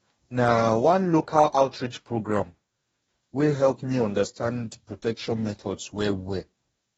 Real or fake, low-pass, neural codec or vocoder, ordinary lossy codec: fake; 19.8 kHz; codec, 44.1 kHz, 2.6 kbps, DAC; AAC, 24 kbps